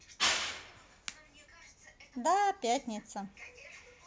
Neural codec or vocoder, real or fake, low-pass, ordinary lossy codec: none; real; none; none